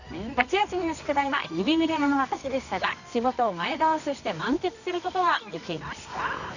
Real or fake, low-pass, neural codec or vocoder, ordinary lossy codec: fake; 7.2 kHz; codec, 24 kHz, 0.9 kbps, WavTokenizer, medium music audio release; none